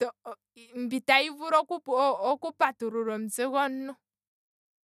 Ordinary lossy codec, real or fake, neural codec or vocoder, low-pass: none; real; none; 14.4 kHz